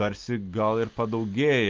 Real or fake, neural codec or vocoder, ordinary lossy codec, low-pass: real; none; Opus, 24 kbps; 7.2 kHz